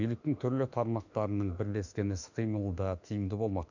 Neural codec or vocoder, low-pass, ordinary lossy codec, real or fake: autoencoder, 48 kHz, 32 numbers a frame, DAC-VAE, trained on Japanese speech; 7.2 kHz; none; fake